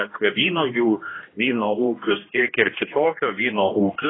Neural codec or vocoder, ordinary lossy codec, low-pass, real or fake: codec, 16 kHz, 1 kbps, X-Codec, HuBERT features, trained on general audio; AAC, 16 kbps; 7.2 kHz; fake